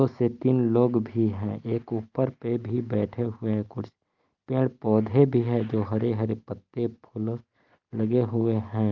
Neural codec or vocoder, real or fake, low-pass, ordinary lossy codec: none; real; 7.2 kHz; Opus, 24 kbps